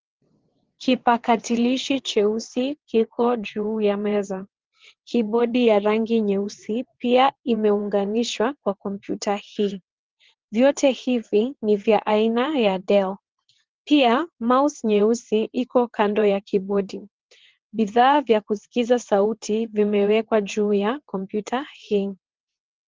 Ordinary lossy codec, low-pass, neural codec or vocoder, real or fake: Opus, 16 kbps; 7.2 kHz; vocoder, 22.05 kHz, 80 mel bands, WaveNeXt; fake